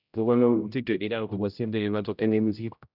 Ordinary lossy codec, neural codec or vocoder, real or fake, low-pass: none; codec, 16 kHz, 0.5 kbps, X-Codec, HuBERT features, trained on general audio; fake; 5.4 kHz